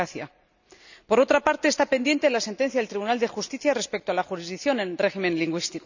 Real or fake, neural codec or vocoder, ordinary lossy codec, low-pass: real; none; none; 7.2 kHz